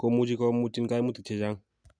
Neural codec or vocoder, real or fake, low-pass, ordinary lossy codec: none; real; 9.9 kHz; none